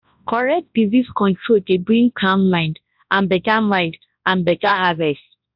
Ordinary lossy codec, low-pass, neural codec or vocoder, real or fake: AAC, 48 kbps; 5.4 kHz; codec, 24 kHz, 0.9 kbps, WavTokenizer, large speech release; fake